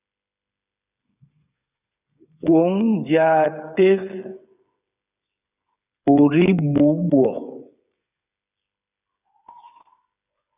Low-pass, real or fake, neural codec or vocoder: 3.6 kHz; fake; codec, 16 kHz, 8 kbps, FreqCodec, smaller model